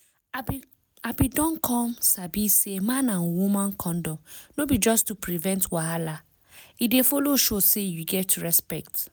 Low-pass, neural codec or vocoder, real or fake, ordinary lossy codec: none; none; real; none